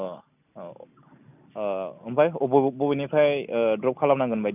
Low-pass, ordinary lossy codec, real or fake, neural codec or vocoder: 3.6 kHz; none; real; none